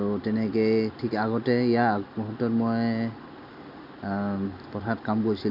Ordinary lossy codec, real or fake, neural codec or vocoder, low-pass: none; real; none; 5.4 kHz